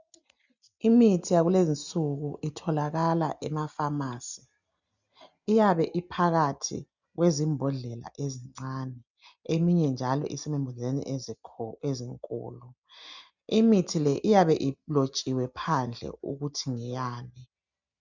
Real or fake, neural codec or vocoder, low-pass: real; none; 7.2 kHz